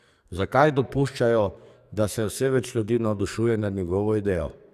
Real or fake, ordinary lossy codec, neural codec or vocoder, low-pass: fake; none; codec, 44.1 kHz, 2.6 kbps, SNAC; 14.4 kHz